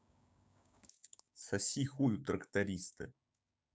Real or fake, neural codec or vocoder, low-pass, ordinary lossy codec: fake; codec, 16 kHz, 6 kbps, DAC; none; none